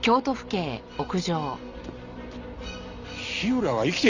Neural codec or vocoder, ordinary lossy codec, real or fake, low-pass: none; Opus, 64 kbps; real; 7.2 kHz